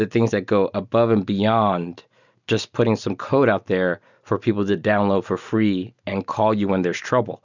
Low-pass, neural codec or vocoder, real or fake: 7.2 kHz; none; real